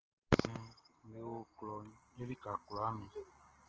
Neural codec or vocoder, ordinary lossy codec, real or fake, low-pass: none; Opus, 16 kbps; real; 7.2 kHz